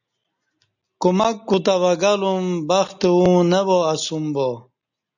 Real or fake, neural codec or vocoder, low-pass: real; none; 7.2 kHz